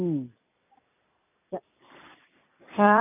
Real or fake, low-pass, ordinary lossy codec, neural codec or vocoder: fake; 3.6 kHz; none; vocoder, 44.1 kHz, 128 mel bands every 256 samples, BigVGAN v2